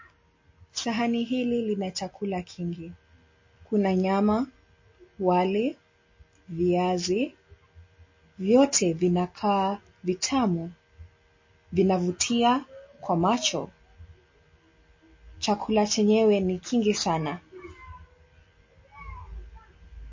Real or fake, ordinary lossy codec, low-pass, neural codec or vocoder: real; MP3, 32 kbps; 7.2 kHz; none